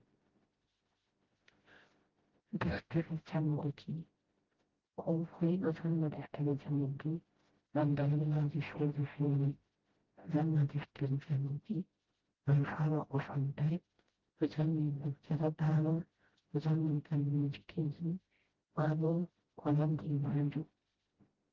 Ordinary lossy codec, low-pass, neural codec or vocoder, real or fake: Opus, 16 kbps; 7.2 kHz; codec, 16 kHz, 0.5 kbps, FreqCodec, smaller model; fake